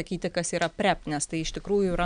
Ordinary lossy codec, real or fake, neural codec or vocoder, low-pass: MP3, 96 kbps; real; none; 9.9 kHz